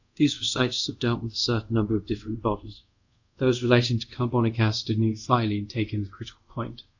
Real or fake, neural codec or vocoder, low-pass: fake; codec, 24 kHz, 0.5 kbps, DualCodec; 7.2 kHz